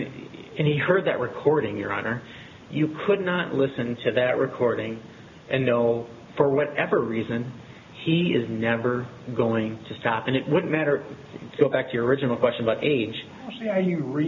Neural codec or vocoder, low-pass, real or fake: none; 7.2 kHz; real